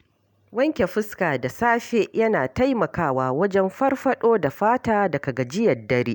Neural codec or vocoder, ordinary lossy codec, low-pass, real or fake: none; none; 19.8 kHz; real